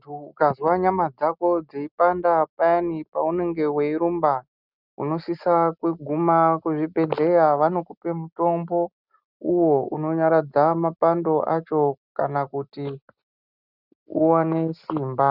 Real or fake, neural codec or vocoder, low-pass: real; none; 5.4 kHz